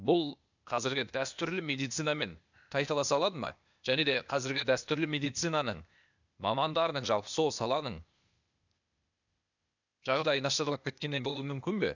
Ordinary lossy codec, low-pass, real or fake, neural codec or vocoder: none; 7.2 kHz; fake; codec, 16 kHz, 0.8 kbps, ZipCodec